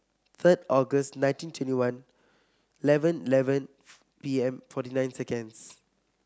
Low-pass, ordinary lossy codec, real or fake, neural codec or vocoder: none; none; real; none